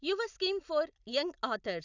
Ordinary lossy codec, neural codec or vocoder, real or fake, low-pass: none; none; real; 7.2 kHz